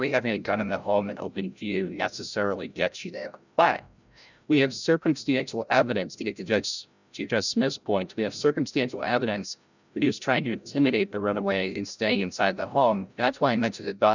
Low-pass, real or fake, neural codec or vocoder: 7.2 kHz; fake; codec, 16 kHz, 0.5 kbps, FreqCodec, larger model